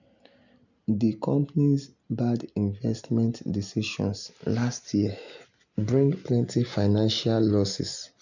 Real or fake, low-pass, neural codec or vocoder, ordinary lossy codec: real; 7.2 kHz; none; none